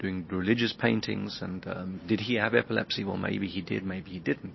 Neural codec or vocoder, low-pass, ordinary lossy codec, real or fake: none; 7.2 kHz; MP3, 24 kbps; real